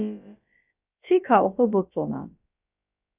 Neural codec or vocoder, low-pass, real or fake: codec, 16 kHz, about 1 kbps, DyCAST, with the encoder's durations; 3.6 kHz; fake